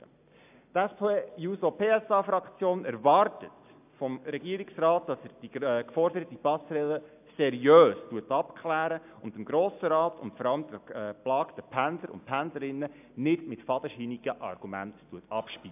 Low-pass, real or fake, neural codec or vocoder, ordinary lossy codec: 3.6 kHz; real; none; AAC, 32 kbps